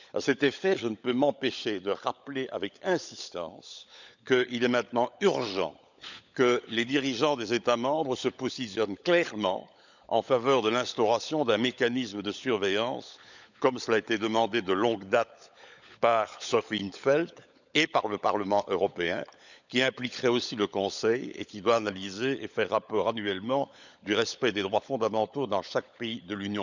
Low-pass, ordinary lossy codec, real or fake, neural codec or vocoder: 7.2 kHz; none; fake; codec, 16 kHz, 16 kbps, FunCodec, trained on LibriTTS, 50 frames a second